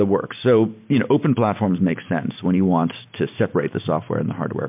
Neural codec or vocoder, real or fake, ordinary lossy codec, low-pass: none; real; MP3, 32 kbps; 3.6 kHz